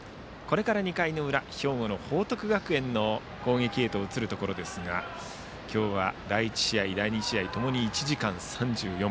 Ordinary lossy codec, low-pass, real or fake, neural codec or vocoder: none; none; real; none